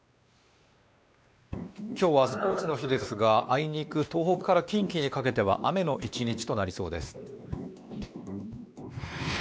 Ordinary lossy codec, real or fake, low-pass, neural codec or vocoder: none; fake; none; codec, 16 kHz, 2 kbps, X-Codec, WavLM features, trained on Multilingual LibriSpeech